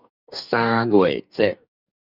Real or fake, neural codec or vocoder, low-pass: fake; codec, 44.1 kHz, 2.6 kbps, DAC; 5.4 kHz